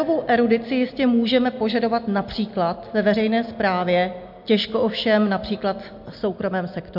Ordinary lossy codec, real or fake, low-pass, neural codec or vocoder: MP3, 48 kbps; real; 5.4 kHz; none